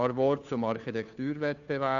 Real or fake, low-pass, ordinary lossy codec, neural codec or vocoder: fake; 7.2 kHz; none; codec, 16 kHz, 2 kbps, FunCodec, trained on Chinese and English, 25 frames a second